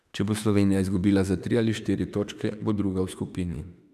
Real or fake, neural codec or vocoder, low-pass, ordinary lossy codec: fake; autoencoder, 48 kHz, 32 numbers a frame, DAC-VAE, trained on Japanese speech; 14.4 kHz; none